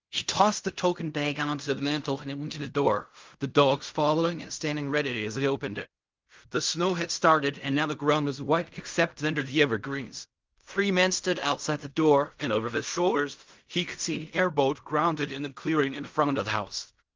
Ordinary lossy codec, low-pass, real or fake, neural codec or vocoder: Opus, 24 kbps; 7.2 kHz; fake; codec, 16 kHz in and 24 kHz out, 0.4 kbps, LongCat-Audio-Codec, fine tuned four codebook decoder